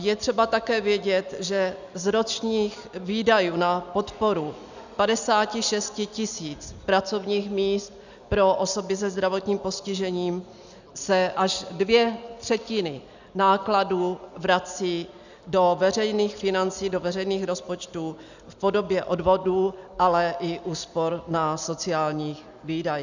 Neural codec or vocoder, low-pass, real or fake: none; 7.2 kHz; real